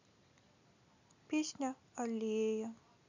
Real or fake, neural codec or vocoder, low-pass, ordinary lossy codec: real; none; 7.2 kHz; none